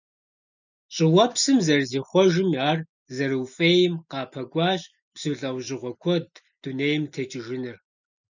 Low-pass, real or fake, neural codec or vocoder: 7.2 kHz; real; none